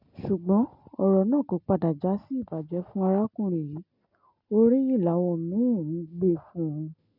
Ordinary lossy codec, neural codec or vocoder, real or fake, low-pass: none; none; real; 5.4 kHz